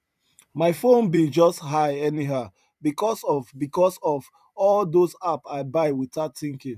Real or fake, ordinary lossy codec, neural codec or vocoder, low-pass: fake; none; vocoder, 44.1 kHz, 128 mel bands every 512 samples, BigVGAN v2; 14.4 kHz